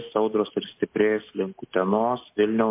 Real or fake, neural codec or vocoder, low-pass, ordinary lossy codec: real; none; 3.6 kHz; MP3, 24 kbps